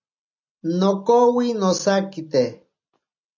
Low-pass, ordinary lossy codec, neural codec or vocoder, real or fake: 7.2 kHz; MP3, 64 kbps; none; real